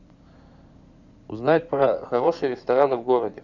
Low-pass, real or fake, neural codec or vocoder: 7.2 kHz; fake; codec, 16 kHz in and 24 kHz out, 2.2 kbps, FireRedTTS-2 codec